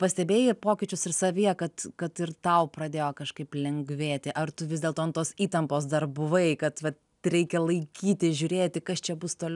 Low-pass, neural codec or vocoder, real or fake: 10.8 kHz; none; real